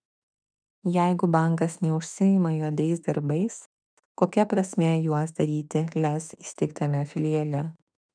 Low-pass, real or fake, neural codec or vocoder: 9.9 kHz; fake; autoencoder, 48 kHz, 32 numbers a frame, DAC-VAE, trained on Japanese speech